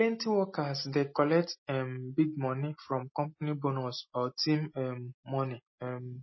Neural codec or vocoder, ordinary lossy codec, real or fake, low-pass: none; MP3, 24 kbps; real; 7.2 kHz